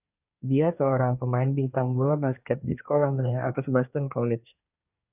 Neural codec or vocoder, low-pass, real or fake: codec, 24 kHz, 1 kbps, SNAC; 3.6 kHz; fake